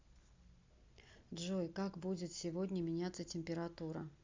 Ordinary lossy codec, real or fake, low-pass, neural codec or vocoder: MP3, 64 kbps; real; 7.2 kHz; none